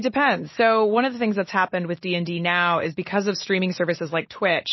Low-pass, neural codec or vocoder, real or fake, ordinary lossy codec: 7.2 kHz; none; real; MP3, 24 kbps